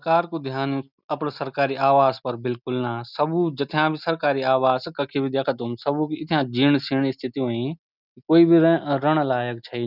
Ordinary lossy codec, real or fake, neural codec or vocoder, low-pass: none; real; none; 5.4 kHz